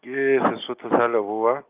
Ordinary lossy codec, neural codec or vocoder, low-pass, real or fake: Opus, 32 kbps; none; 3.6 kHz; real